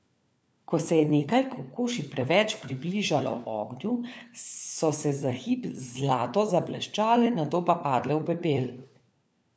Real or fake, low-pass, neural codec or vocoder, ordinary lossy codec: fake; none; codec, 16 kHz, 4 kbps, FunCodec, trained on LibriTTS, 50 frames a second; none